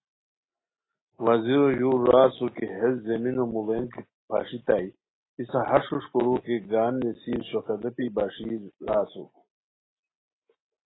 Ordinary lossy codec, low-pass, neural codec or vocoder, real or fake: AAC, 16 kbps; 7.2 kHz; none; real